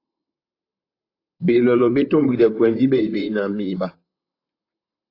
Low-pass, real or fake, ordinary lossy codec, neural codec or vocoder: 5.4 kHz; fake; AAC, 32 kbps; vocoder, 44.1 kHz, 128 mel bands, Pupu-Vocoder